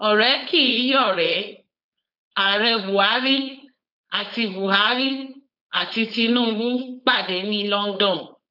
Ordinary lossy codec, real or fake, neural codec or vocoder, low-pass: none; fake; codec, 16 kHz, 4.8 kbps, FACodec; 5.4 kHz